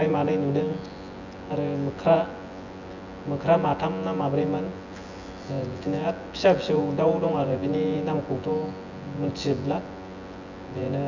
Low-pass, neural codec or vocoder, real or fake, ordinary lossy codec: 7.2 kHz; vocoder, 24 kHz, 100 mel bands, Vocos; fake; none